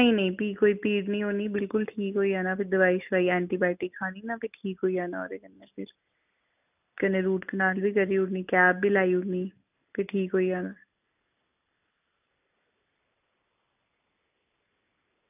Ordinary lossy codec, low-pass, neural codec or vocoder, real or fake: none; 3.6 kHz; none; real